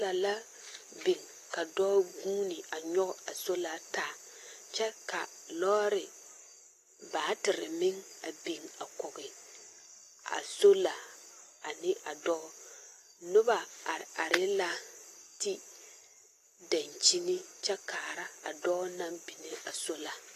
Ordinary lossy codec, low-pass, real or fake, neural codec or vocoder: AAC, 48 kbps; 14.4 kHz; real; none